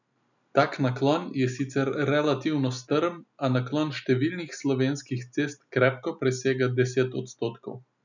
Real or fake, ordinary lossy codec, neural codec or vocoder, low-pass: real; none; none; 7.2 kHz